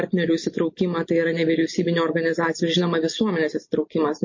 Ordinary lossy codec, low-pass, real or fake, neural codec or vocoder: MP3, 32 kbps; 7.2 kHz; real; none